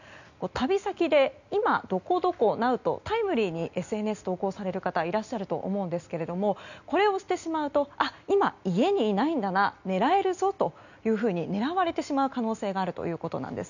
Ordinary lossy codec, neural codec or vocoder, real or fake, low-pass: none; none; real; 7.2 kHz